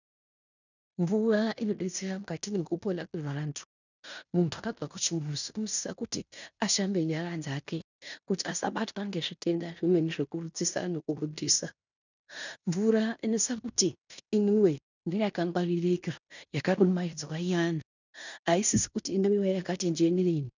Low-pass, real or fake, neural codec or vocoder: 7.2 kHz; fake; codec, 16 kHz in and 24 kHz out, 0.9 kbps, LongCat-Audio-Codec, fine tuned four codebook decoder